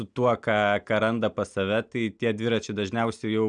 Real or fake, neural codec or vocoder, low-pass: real; none; 9.9 kHz